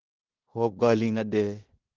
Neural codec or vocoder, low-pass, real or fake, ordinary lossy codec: codec, 16 kHz in and 24 kHz out, 0.9 kbps, LongCat-Audio-Codec, fine tuned four codebook decoder; 7.2 kHz; fake; Opus, 16 kbps